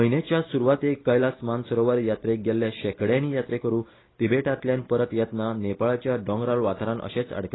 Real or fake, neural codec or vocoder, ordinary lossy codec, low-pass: real; none; AAC, 16 kbps; 7.2 kHz